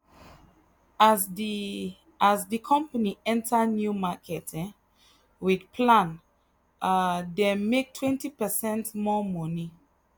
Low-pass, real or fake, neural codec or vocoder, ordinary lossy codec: none; real; none; none